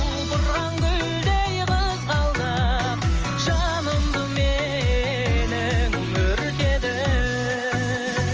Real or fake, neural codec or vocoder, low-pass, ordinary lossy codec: real; none; 7.2 kHz; Opus, 24 kbps